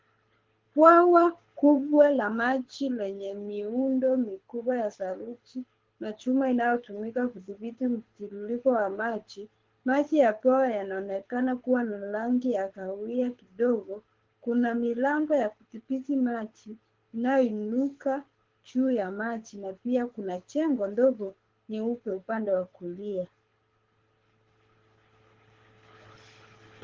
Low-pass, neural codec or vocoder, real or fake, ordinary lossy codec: 7.2 kHz; codec, 24 kHz, 6 kbps, HILCodec; fake; Opus, 16 kbps